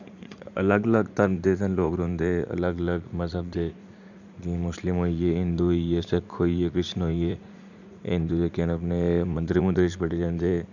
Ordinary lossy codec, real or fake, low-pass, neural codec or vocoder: none; fake; 7.2 kHz; vocoder, 44.1 kHz, 80 mel bands, Vocos